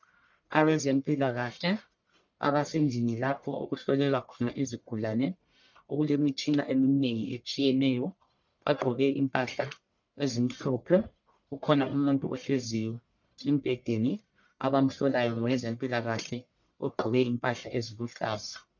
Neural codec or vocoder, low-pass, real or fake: codec, 44.1 kHz, 1.7 kbps, Pupu-Codec; 7.2 kHz; fake